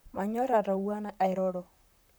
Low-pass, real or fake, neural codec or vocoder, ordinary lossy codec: none; fake; vocoder, 44.1 kHz, 128 mel bands, Pupu-Vocoder; none